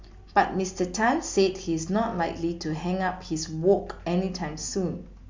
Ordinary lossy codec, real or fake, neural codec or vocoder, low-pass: MP3, 64 kbps; real; none; 7.2 kHz